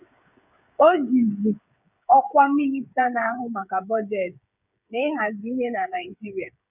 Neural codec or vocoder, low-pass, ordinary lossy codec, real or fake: codec, 16 kHz, 8 kbps, FreqCodec, smaller model; 3.6 kHz; none; fake